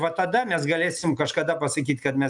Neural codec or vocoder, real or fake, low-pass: none; real; 10.8 kHz